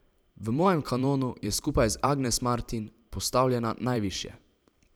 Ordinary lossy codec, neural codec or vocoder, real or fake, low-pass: none; vocoder, 44.1 kHz, 128 mel bands every 512 samples, BigVGAN v2; fake; none